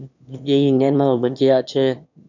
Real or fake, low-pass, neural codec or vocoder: fake; 7.2 kHz; autoencoder, 22.05 kHz, a latent of 192 numbers a frame, VITS, trained on one speaker